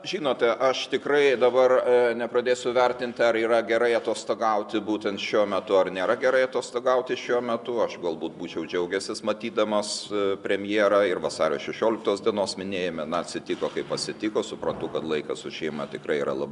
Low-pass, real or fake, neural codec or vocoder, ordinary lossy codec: 10.8 kHz; real; none; MP3, 96 kbps